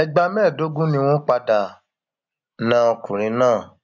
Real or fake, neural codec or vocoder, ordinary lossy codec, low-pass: real; none; none; 7.2 kHz